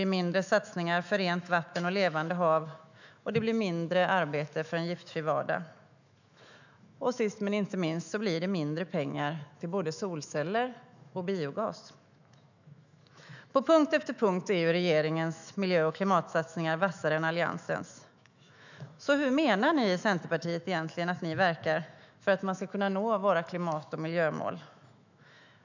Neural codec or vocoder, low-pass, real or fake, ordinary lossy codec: autoencoder, 48 kHz, 128 numbers a frame, DAC-VAE, trained on Japanese speech; 7.2 kHz; fake; none